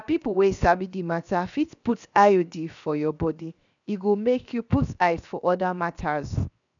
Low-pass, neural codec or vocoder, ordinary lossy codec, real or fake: 7.2 kHz; codec, 16 kHz, 0.7 kbps, FocalCodec; none; fake